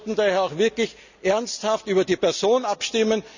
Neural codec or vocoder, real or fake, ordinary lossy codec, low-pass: none; real; none; 7.2 kHz